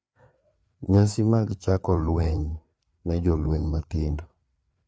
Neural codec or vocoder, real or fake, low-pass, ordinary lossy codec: codec, 16 kHz, 4 kbps, FreqCodec, larger model; fake; none; none